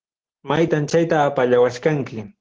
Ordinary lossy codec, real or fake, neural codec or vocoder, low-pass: Opus, 16 kbps; real; none; 7.2 kHz